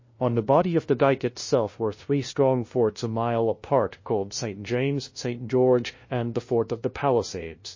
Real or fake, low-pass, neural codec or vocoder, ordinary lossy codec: fake; 7.2 kHz; codec, 16 kHz, 0.5 kbps, FunCodec, trained on LibriTTS, 25 frames a second; MP3, 32 kbps